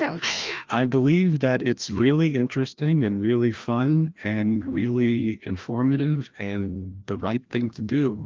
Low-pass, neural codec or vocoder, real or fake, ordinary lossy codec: 7.2 kHz; codec, 16 kHz, 1 kbps, FreqCodec, larger model; fake; Opus, 32 kbps